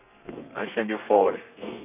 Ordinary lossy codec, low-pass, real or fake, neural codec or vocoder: none; 3.6 kHz; fake; codec, 32 kHz, 1.9 kbps, SNAC